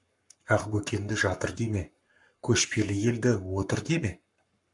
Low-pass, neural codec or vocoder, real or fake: 10.8 kHz; codec, 44.1 kHz, 7.8 kbps, Pupu-Codec; fake